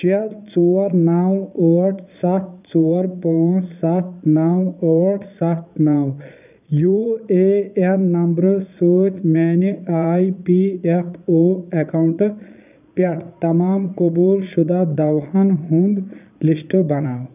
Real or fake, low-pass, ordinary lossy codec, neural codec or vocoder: fake; 3.6 kHz; none; vocoder, 22.05 kHz, 80 mel bands, Vocos